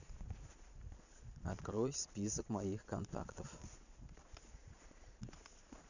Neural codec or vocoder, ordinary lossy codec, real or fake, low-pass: vocoder, 22.05 kHz, 80 mel bands, Vocos; none; fake; 7.2 kHz